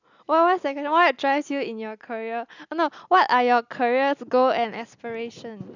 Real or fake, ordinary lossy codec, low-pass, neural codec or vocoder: real; none; 7.2 kHz; none